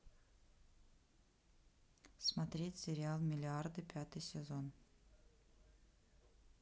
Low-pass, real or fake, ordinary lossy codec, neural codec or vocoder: none; real; none; none